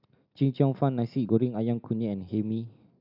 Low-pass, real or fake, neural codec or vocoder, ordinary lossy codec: 5.4 kHz; real; none; none